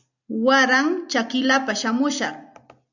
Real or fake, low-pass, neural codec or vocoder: real; 7.2 kHz; none